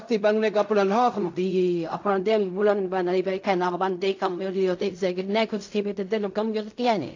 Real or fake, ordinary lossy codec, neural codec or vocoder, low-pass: fake; AAC, 48 kbps; codec, 16 kHz in and 24 kHz out, 0.4 kbps, LongCat-Audio-Codec, fine tuned four codebook decoder; 7.2 kHz